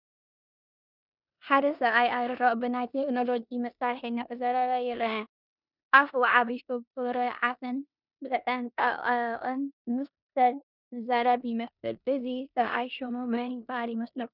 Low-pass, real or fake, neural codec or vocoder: 5.4 kHz; fake; codec, 16 kHz in and 24 kHz out, 0.9 kbps, LongCat-Audio-Codec, four codebook decoder